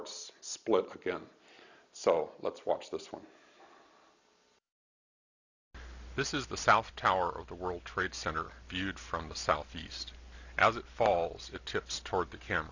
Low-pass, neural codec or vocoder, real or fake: 7.2 kHz; none; real